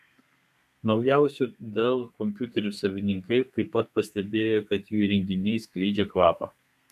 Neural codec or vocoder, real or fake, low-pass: codec, 32 kHz, 1.9 kbps, SNAC; fake; 14.4 kHz